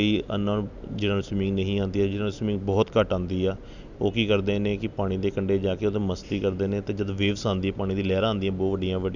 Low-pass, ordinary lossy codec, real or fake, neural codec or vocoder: 7.2 kHz; none; real; none